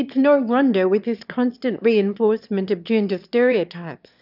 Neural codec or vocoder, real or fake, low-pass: autoencoder, 22.05 kHz, a latent of 192 numbers a frame, VITS, trained on one speaker; fake; 5.4 kHz